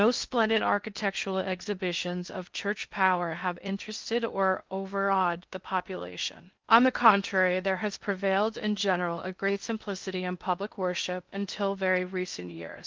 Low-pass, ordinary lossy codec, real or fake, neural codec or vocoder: 7.2 kHz; Opus, 32 kbps; fake; codec, 16 kHz in and 24 kHz out, 0.8 kbps, FocalCodec, streaming, 65536 codes